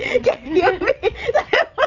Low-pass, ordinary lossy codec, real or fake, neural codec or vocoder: 7.2 kHz; none; fake; vocoder, 22.05 kHz, 80 mel bands, WaveNeXt